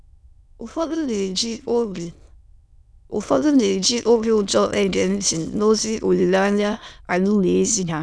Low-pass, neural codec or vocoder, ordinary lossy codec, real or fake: none; autoencoder, 22.05 kHz, a latent of 192 numbers a frame, VITS, trained on many speakers; none; fake